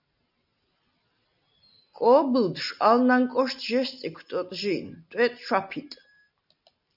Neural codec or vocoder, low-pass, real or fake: none; 5.4 kHz; real